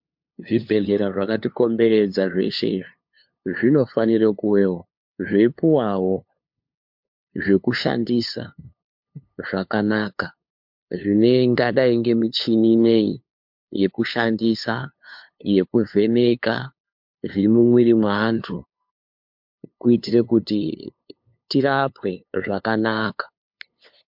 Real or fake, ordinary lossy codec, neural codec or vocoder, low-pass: fake; MP3, 48 kbps; codec, 16 kHz, 2 kbps, FunCodec, trained on LibriTTS, 25 frames a second; 5.4 kHz